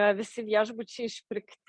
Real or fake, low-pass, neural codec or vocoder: real; 9.9 kHz; none